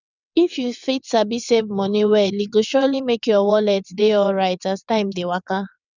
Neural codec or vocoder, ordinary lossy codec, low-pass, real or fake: vocoder, 22.05 kHz, 80 mel bands, WaveNeXt; none; 7.2 kHz; fake